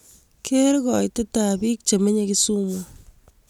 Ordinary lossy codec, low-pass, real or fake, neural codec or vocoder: none; 19.8 kHz; real; none